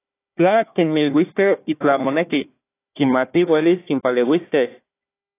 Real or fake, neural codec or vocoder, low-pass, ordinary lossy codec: fake; codec, 16 kHz, 1 kbps, FunCodec, trained on Chinese and English, 50 frames a second; 3.6 kHz; AAC, 24 kbps